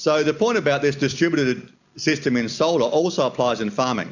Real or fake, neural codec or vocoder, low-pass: real; none; 7.2 kHz